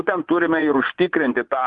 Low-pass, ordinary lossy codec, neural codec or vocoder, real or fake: 10.8 kHz; Opus, 32 kbps; vocoder, 24 kHz, 100 mel bands, Vocos; fake